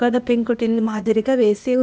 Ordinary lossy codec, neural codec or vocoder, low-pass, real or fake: none; codec, 16 kHz, 0.8 kbps, ZipCodec; none; fake